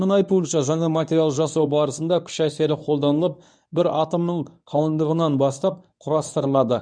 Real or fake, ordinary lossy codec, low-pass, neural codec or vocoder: fake; none; 9.9 kHz; codec, 24 kHz, 0.9 kbps, WavTokenizer, medium speech release version 1